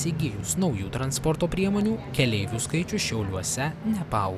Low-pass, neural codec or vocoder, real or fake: 14.4 kHz; none; real